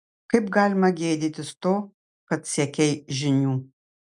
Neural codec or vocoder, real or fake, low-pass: none; real; 10.8 kHz